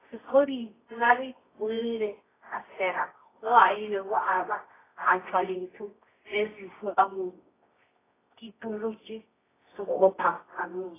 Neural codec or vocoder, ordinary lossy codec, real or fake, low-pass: codec, 24 kHz, 0.9 kbps, WavTokenizer, medium music audio release; AAC, 16 kbps; fake; 3.6 kHz